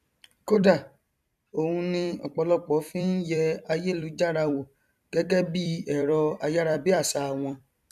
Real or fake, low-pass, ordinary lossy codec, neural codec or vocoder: fake; 14.4 kHz; none; vocoder, 44.1 kHz, 128 mel bands every 256 samples, BigVGAN v2